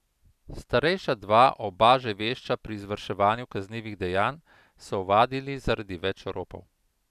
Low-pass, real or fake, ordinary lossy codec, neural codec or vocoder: 14.4 kHz; real; none; none